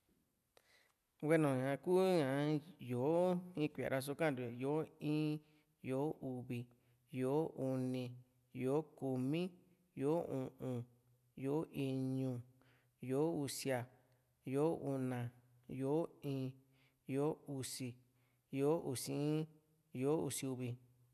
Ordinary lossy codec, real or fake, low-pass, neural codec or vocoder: none; real; 14.4 kHz; none